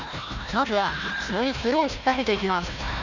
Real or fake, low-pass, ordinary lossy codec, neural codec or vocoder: fake; 7.2 kHz; none; codec, 16 kHz, 1 kbps, FunCodec, trained on Chinese and English, 50 frames a second